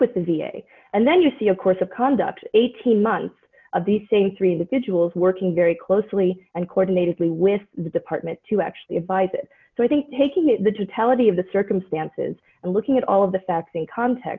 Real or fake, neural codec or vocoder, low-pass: real; none; 7.2 kHz